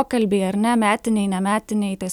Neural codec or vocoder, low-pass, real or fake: none; 19.8 kHz; real